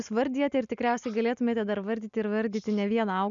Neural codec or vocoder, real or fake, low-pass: none; real; 7.2 kHz